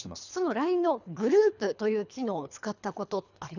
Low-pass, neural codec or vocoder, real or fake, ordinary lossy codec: 7.2 kHz; codec, 24 kHz, 3 kbps, HILCodec; fake; none